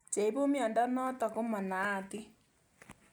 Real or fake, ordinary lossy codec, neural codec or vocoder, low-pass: real; none; none; none